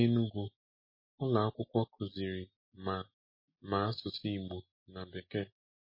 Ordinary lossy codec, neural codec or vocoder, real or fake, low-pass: MP3, 24 kbps; none; real; 5.4 kHz